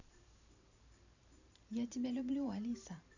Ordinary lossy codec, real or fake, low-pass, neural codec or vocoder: none; fake; 7.2 kHz; vocoder, 44.1 kHz, 128 mel bands every 512 samples, BigVGAN v2